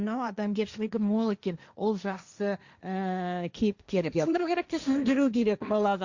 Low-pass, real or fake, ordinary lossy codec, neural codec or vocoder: 7.2 kHz; fake; Opus, 64 kbps; codec, 16 kHz, 1.1 kbps, Voila-Tokenizer